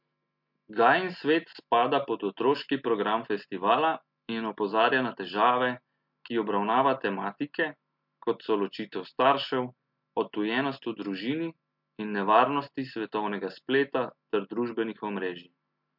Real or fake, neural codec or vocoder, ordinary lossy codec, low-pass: real; none; MP3, 48 kbps; 5.4 kHz